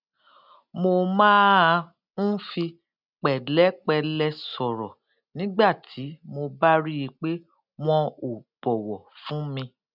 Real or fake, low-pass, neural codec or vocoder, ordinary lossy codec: real; 5.4 kHz; none; none